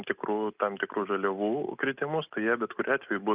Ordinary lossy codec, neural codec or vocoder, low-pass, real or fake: Opus, 32 kbps; none; 3.6 kHz; real